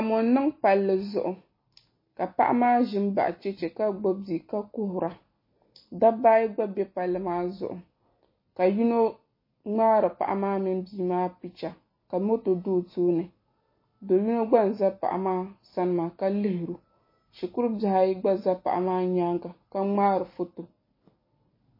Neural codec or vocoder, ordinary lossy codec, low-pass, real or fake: none; MP3, 24 kbps; 5.4 kHz; real